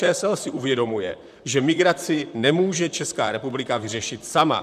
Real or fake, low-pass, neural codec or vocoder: fake; 14.4 kHz; vocoder, 44.1 kHz, 128 mel bands, Pupu-Vocoder